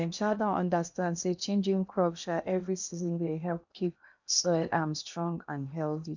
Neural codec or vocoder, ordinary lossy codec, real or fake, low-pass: codec, 16 kHz in and 24 kHz out, 0.8 kbps, FocalCodec, streaming, 65536 codes; none; fake; 7.2 kHz